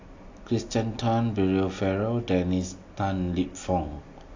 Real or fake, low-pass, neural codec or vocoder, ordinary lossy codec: real; 7.2 kHz; none; AAC, 48 kbps